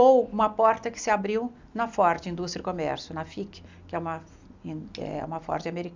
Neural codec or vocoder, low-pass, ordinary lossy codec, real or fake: none; 7.2 kHz; MP3, 64 kbps; real